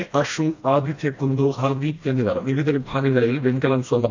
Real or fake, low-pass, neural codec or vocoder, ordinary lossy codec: fake; 7.2 kHz; codec, 16 kHz, 1 kbps, FreqCodec, smaller model; AAC, 48 kbps